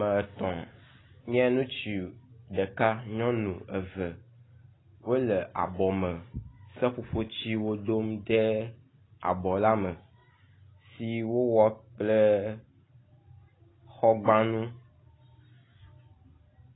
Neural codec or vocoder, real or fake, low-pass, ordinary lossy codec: none; real; 7.2 kHz; AAC, 16 kbps